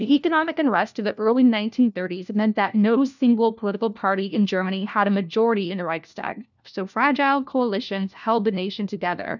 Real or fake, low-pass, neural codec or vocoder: fake; 7.2 kHz; codec, 16 kHz, 1 kbps, FunCodec, trained on LibriTTS, 50 frames a second